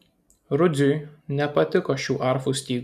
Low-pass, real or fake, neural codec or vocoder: 14.4 kHz; real; none